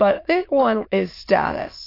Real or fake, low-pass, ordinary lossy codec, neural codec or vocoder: fake; 5.4 kHz; AAC, 32 kbps; autoencoder, 22.05 kHz, a latent of 192 numbers a frame, VITS, trained on many speakers